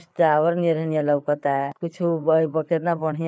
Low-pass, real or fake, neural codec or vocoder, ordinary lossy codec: none; fake; codec, 16 kHz, 4 kbps, FreqCodec, larger model; none